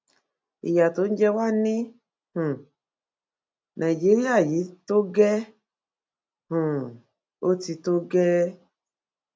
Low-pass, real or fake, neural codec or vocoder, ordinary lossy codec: none; real; none; none